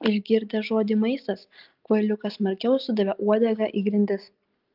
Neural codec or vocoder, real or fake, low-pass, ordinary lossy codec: none; real; 5.4 kHz; Opus, 32 kbps